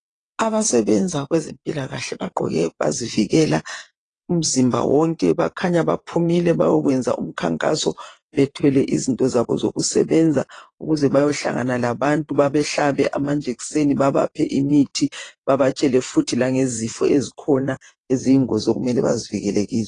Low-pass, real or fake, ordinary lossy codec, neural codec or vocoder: 9.9 kHz; fake; AAC, 32 kbps; vocoder, 22.05 kHz, 80 mel bands, WaveNeXt